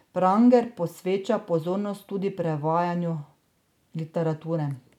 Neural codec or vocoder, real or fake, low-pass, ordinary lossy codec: none; real; 19.8 kHz; none